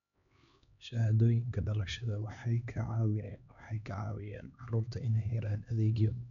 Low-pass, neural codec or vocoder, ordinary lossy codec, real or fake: 7.2 kHz; codec, 16 kHz, 2 kbps, X-Codec, HuBERT features, trained on LibriSpeech; none; fake